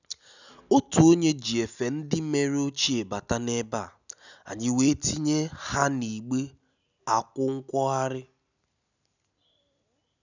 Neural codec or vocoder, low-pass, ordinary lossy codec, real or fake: none; 7.2 kHz; none; real